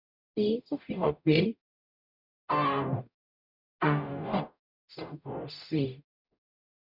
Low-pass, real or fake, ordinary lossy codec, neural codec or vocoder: 5.4 kHz; fake; none; codec, 44.1 kHz, 0.9 kbps, DAC